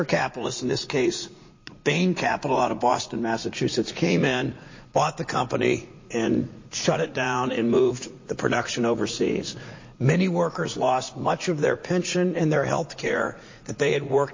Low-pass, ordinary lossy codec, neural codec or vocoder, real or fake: 7.2 kHz; MP3, 32 kbps; vocoder, 44.1 kHz, 80 mel bands, Vocos; fake